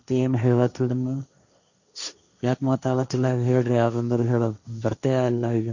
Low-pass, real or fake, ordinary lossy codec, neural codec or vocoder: 7.2 kHz; fake; none; codec, 16 kHz, 1.1 kbps, Voila-Tokenizer